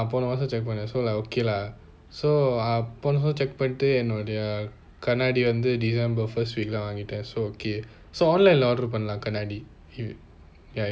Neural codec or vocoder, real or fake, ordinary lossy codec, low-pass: none; real; none; none